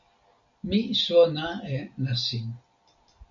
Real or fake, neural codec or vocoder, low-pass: real; none; 7.2 kHz